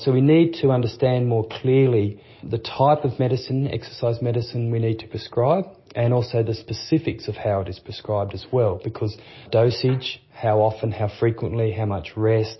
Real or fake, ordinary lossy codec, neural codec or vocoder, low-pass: real; MP3, 24 kbps; none; 7.2 kHz